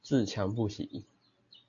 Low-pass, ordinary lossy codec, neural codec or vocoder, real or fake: 7.2 kHz; AAC, 64 kbps; none; real